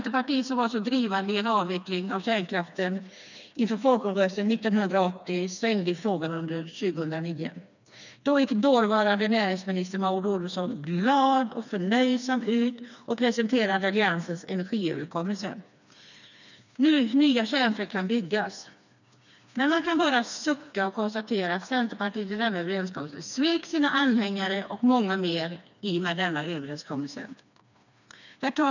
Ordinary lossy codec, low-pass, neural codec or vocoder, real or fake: none; 7.2 kHz; codec, 16 kHz, 2 kbps, FreqCodec, smaller model; fake